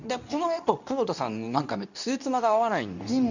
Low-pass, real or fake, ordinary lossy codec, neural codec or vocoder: 7.2 kHz; fake; none; codec, 24 kHz, 0.9 kbps, WavTokenizer, medium speech release version 2